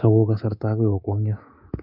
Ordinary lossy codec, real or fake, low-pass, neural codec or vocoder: none; fake; 5.4 kHz; codec, 24 kHz, 3.1 kbps, DualCodec